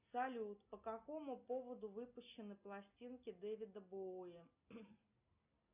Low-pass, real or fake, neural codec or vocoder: 3.6 kHz; real; none